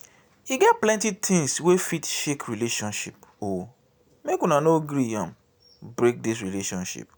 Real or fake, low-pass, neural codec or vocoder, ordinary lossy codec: real; none; none; none